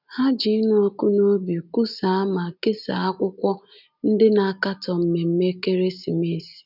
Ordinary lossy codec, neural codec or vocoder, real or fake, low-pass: none; none; real; 5.4 kHz